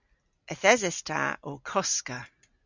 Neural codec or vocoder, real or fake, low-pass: none; real; 7.2 kHz